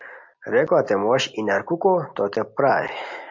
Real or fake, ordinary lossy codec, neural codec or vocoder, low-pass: real; MP3, 48 kbps; none; 7.2 kHz